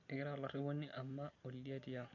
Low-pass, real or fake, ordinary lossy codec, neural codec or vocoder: 7.2 kHz; real; none; none